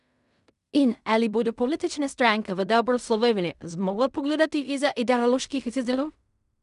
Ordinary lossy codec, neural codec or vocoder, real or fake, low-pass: none; codec, 16 kHz in and 24 kHz out, 0.4 kbps, LongCat-Audio-Codec, fine tuned four codebook decoder; fake; 10.8 kHz